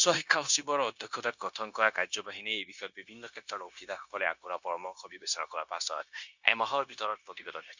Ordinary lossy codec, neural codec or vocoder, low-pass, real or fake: Opus, 64 kbps; codec, 16 kHz, 0.9 kbps, LongCat-Audio-Codec; 7.2 kHz; fake